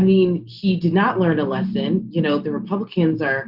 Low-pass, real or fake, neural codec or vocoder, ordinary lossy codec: 5.4 kHz; real; none; Opus, 64 kbps